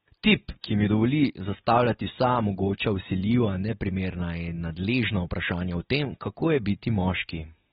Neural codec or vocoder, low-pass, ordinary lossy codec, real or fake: none; 19.8 kHz; AAC, 16 kbps; real